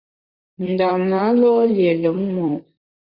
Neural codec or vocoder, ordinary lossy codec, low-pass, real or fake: vocoder, 44.1 kHz, 128 mel bands, Pupu-Vocoder; Opus, 64 kbps; 5.4 kHz; fake